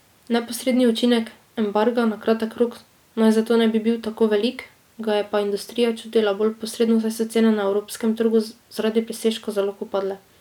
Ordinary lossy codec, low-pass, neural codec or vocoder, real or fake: none; 19.8 kHz; none; real